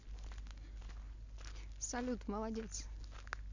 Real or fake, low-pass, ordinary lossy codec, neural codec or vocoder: real; 7.2 kHz; none; none